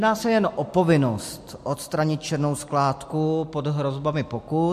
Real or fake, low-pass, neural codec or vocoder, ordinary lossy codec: real; 14.4 kHz; none; MP3, 64 kbps